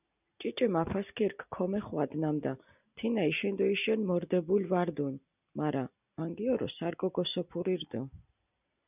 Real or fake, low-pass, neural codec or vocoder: real; 3.6 kHz; none